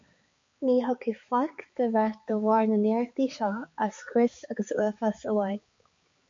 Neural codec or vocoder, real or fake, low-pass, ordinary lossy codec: codec, 16 kHz, 4 kbps, X-Codec, HuBERT features, trained on balanced general audio; fake; 7.2 kHz; MP3, 48 kbps